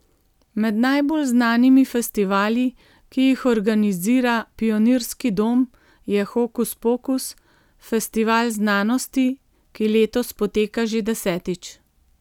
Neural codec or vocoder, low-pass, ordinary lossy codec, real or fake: none; 19.8 kHz; none; real